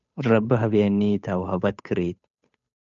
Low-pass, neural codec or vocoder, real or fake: 7.2 kHz; codec, 16 kHz, 8 kbps, FunCodec, trained on Chinese and English, 25 frames a second; fake